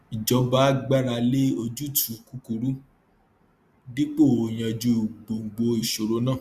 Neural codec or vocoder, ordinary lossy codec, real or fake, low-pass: none; Opus, 64 kbps; real; 14.4 kHz